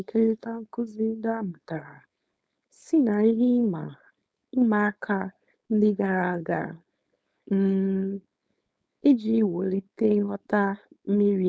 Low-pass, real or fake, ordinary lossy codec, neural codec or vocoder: none; fake; none; codec, 16 kHz, 4.8 kbps, FACodec